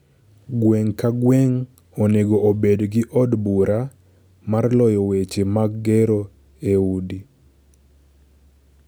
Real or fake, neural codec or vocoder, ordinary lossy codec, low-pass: real; none; none; none